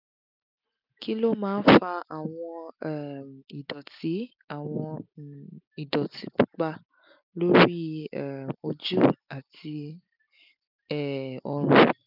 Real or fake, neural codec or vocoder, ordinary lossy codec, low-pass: real; none; none; 5.4 kHz